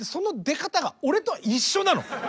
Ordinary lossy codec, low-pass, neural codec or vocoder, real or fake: none; none; none; real